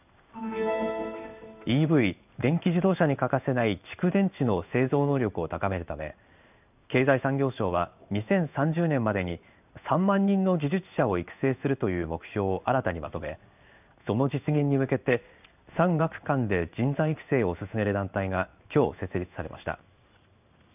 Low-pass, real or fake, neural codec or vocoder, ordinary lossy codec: 3.6 kHz; fake; codec, 16 kHz in and 24 kHz out, 1 kbps, XY-Tokenizer; none